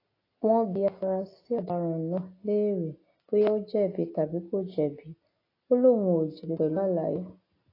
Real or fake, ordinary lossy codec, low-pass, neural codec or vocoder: real; AAC, 24 kbps; 5.4 kHz; none